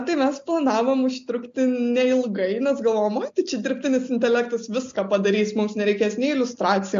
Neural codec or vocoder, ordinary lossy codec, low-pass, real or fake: none; MP3, 48 kbps; 7.2 kHz; real